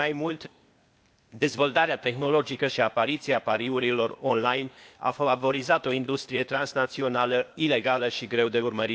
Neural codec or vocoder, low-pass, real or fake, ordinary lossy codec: codec, 16 kHz, 0.8 kbps, ZipCodec; none; fake; none